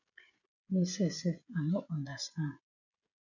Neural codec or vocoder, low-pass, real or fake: codec, 16 kHz, 16 kbps, FreqCodec, smaller model; 7.2 kHz; fake